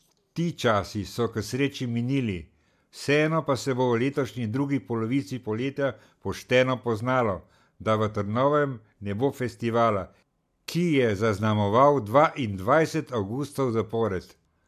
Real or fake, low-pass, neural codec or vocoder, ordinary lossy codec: real; 14.4 kHz; none; MP3, 96 kbps